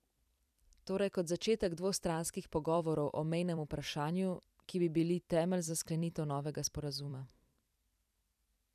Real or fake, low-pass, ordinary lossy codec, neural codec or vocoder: fake; 14.4 kHz; none; vocoder, 44.1 kHz, 128 mel bands every 256 samples, BigVGAN v2